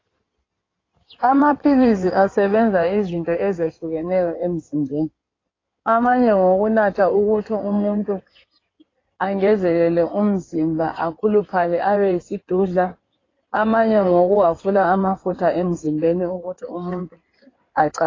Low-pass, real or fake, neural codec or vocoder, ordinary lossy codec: 7.2 kHz; fake; codec, 16 kHz in and 24 kHz out, 2.2 kbps, FireRedTTS-2 codec; AAC, 32 kbps